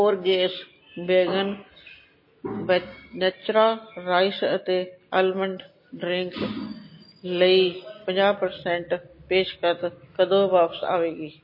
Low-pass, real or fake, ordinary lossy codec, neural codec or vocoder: 5.4 kHz; real; MP3, 24 kbps; none